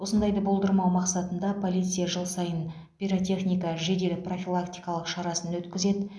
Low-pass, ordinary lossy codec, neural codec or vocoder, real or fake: none; none; none; real